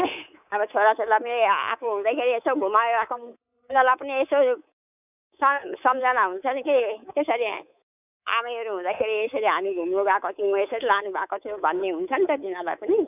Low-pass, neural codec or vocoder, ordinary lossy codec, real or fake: 3.6 kHz; codec, 24 kHz, 3.1 kbps, DualCodec; none; fake